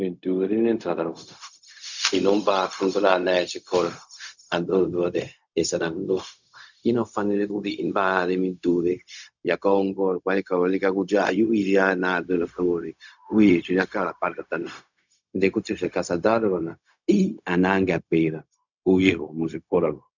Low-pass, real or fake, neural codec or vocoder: 7.2 kHz; fake; codec, 16 kHz, 0.4 kbps, LongCat-Audio-Codec